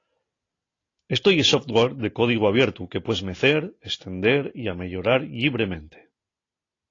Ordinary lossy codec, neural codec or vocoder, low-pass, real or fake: AAC, 32 kbps; none; 7.2 kHz; real